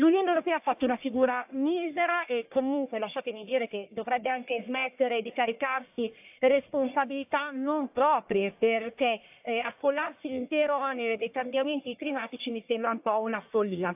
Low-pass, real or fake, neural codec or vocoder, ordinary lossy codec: 3.6 kHz; fake; codec, 44.1 kHz, 1.7 kbps, Pupu-Codec; AAC, 32 kbps